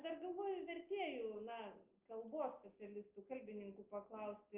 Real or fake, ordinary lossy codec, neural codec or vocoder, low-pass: real; Opus, 24 kbps; none; 3.6 kHz